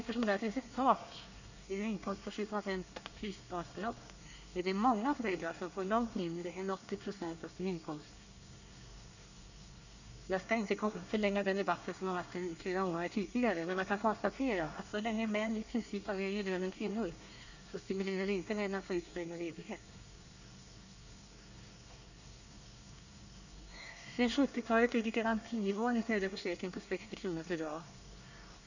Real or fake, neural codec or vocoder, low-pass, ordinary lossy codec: fake; codec, 24 kHz, 1 kbps, SNAC; 7.2 kHz; none